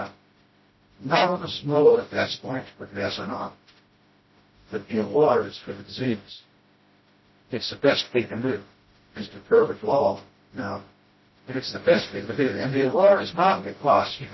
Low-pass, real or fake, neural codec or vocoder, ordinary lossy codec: 7.2 kHz; fake; codec, 16 kHz, 0.5 kbps, FreqCodec, smaller model; MP3, 24 kbps